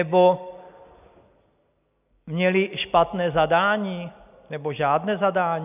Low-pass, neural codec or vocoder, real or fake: 3.6 kHz; none; real